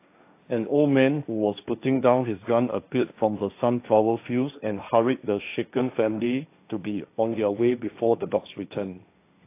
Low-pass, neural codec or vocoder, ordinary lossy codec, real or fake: 3.6 kHz; codec, 16 kHz, 1.1 kbps, Voila-Tokenizer; AAC, 24 kbps; fake